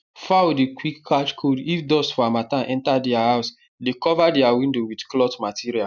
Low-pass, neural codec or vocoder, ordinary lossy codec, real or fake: 7.2 kHz; none; none; real